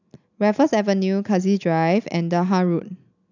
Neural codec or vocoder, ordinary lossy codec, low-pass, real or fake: none; none; 7.2 kHz; real